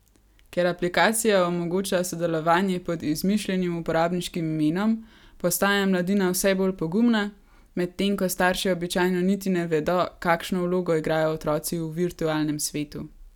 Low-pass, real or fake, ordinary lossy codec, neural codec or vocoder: 19.8 kHz; real; none; none